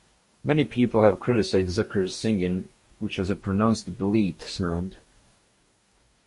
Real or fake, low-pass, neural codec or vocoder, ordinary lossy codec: fake; 14.4 kHz; codec, 44.1 kHz, 2.6 kbps, DAC; MP3, 48 kbps